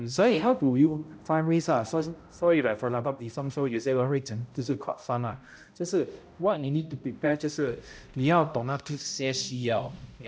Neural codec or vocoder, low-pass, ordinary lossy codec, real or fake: codec, 16 kHz, 0.5 kbps, X-Codec, HuBERT features, trained on balanced general audio; none; none; fake